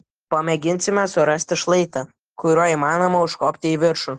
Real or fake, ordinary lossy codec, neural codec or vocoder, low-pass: real; Opus, 16 kbps; none; 14.4 kHz